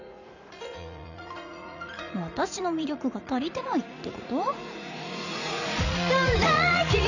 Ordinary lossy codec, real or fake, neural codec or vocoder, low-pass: none; fake; vocoder, 44.1 kHz, 80 mel bands, Vocos; 7.2 kHz